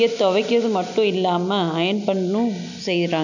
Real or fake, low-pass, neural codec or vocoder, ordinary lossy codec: real; 7.2 kHz; none; none